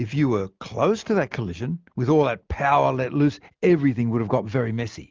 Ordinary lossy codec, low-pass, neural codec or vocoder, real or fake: Opus, 32 kbps; 7.2 kHz; none; real